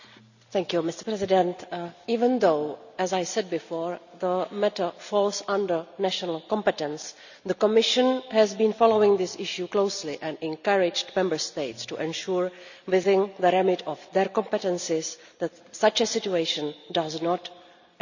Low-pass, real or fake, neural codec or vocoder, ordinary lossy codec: 7.2 kHz; real; none; none